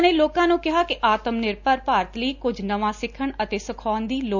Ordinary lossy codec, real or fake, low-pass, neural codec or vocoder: none; real; 7.2 kHz; none